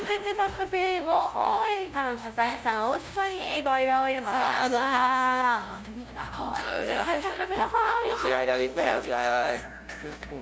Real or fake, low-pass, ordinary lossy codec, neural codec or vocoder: fake; none; none; codec, 16 kHz, 0.5 kbps, FunCodec, trained on LibriTTS, 25 frames a second